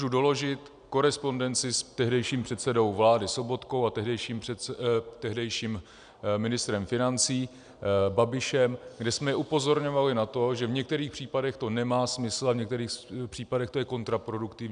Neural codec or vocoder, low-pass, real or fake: none; 9.9 kHz; real